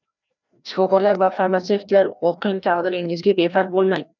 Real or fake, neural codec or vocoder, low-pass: fake; codec, 16 kHz, 1 kbps, FreqCodec, larger model; 7.2 kHz